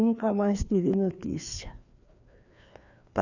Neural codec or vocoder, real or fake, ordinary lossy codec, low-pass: codec, 16 kHz, 2 kbps, FreqCodec, larger model; fake; none; 7.2 kHz